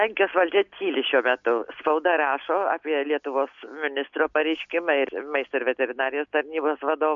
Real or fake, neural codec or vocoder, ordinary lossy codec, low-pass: real; none; MP3, 64 kbps; 7.2 kHz